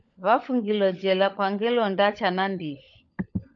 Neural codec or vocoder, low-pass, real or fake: codec, 16 kHz, 16 kbps, FunCodec, trained on LibriTTS, 50 frames a second; 7.2 kHz; fake